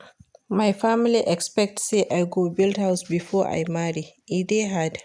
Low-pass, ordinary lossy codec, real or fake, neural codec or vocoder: 9.9 kHz; none; real; none